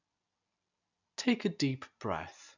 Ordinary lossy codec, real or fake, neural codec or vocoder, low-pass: none; real; none; 7.2 kHz